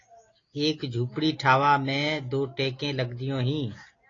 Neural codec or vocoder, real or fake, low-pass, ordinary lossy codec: none; real; 7.2 kHz; AAC, 32 kbps